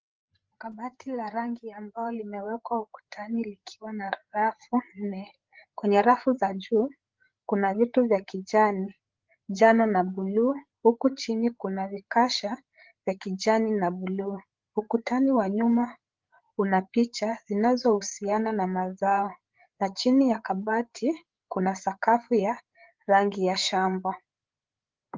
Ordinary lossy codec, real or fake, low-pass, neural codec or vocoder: Opus, 16 kbps; fake; 7.2 kHz; codec, 16 kHz, 8 kbps, FreqCodec, larger model